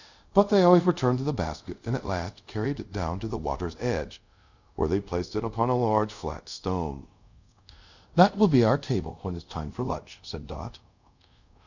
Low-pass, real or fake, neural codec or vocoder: 7.2 kHz; fake; codec, 24 kHz, 0.5 kbps, DualCodec